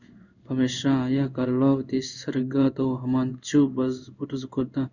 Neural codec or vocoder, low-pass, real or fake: codec, 16 kHz in and 24 kHz out, 1 kbps, XY-Tokenizer; 7.2 kHz; fake